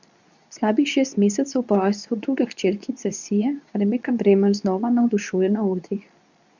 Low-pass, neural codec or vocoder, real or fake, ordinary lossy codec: 7.2 kHz; codec, 24 kHz, 0.9 kbps, WavTokenizer, medium speech release version 2; fake; none